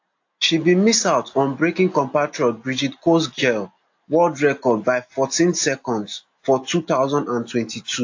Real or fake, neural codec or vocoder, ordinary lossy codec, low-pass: real; none; AAC, 48 kbps; 7.2 kHz